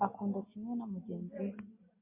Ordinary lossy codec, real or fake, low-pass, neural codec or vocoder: MP3, 24 kbps; real; 3.6 kHz; none